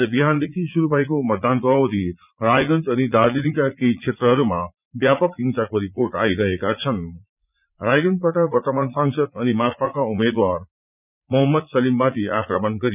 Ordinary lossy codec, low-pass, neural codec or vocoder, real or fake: none; 3.6 kHz; vocoder, 44.1 kHz, 80 mel bands, Vocos; fake